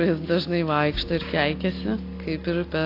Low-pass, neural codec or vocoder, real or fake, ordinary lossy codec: 5.4 kHz; none; real; AAC, 32 kbps